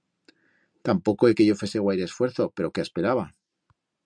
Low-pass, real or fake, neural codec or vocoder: 9.9 kHz; real; none